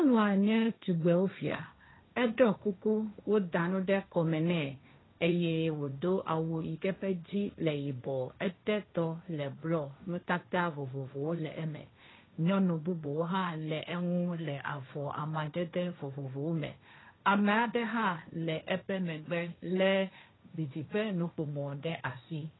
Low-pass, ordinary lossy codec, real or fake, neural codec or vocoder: 7.2 kHz; AAC, 16 kbps; fake; codec, 16 kHz, 1.1 kbps, Voila-Tokenizer